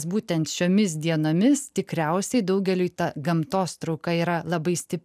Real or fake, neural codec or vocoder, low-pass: real; none; 14.4 kHz